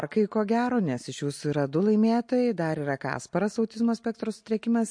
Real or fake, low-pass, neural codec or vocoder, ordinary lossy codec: real; 9.9 kHz; none; MP3, 48 kbps